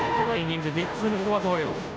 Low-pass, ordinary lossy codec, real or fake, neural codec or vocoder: none; none; fake; codec, 16 kHz, 0.5 kbps, FunCodec, trained on Chinese and English, 25 frames a second